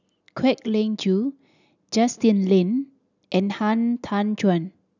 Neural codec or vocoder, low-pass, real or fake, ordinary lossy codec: none; 7.2 kHz; real; none